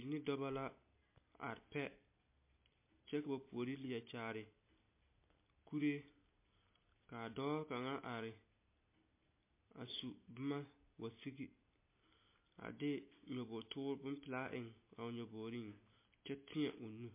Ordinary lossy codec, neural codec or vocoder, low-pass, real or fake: MP3, 24 kbps; none; 3.6 kHz; real